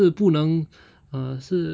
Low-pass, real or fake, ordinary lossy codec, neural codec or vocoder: none; real; none; none